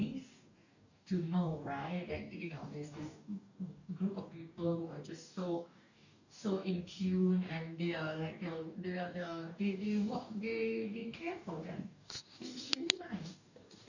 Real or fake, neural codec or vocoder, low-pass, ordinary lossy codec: fake; codec, 44.1 kHz, 2.6 kbps, DAC; 7.2 kHz; none